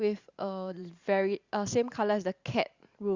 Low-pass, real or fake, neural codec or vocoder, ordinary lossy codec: 7.2 kHz; fake; vocoder, 44.1 kHz, 128 mel bands every 512 samples, BigVGAN v2; Opus, 64 kbps